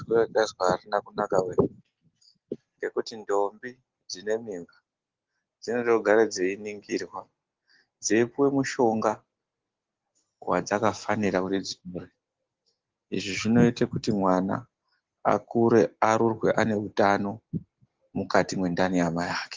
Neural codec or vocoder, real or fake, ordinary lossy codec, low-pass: none; real; Opus, 16 kbps; 7.2 kHz